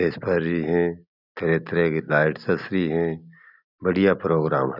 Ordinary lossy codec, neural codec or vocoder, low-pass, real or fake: none; none; 5.4 kHz; real